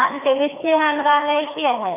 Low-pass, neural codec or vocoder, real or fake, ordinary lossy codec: 3.6 kHz; codec, 16 kHz, 2 kbps, FreqCodec, larger model; fake; none